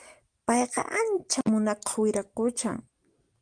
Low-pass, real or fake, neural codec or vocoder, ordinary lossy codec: 9.9 kHz; real; none; Opus, 24 kbps